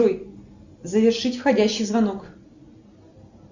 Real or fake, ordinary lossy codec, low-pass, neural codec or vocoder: real; Opus, 64 kbps; 7.2 kHz; none